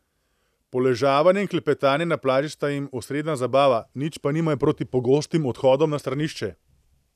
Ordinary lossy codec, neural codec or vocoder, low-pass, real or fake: none; none; 14.4 kHz; real